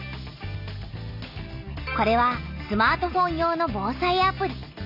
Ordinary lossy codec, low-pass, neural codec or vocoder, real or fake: none; 5.4 kHz; none; real